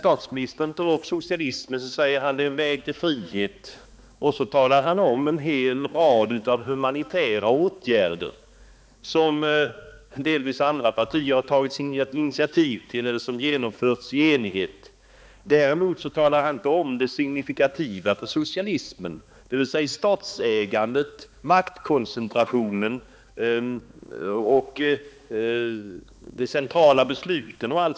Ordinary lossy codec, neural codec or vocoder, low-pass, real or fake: none; codec, 16 kHz, 2 kbps, X-Codec, HuBERT features, trained on balanced general audio; none; fake